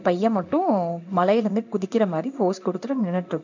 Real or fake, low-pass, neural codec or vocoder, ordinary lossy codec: fake; 7.2 kHz; codec, 16 kHz in and 24 kHz out, 1 kbps, XY-Tokenizer; none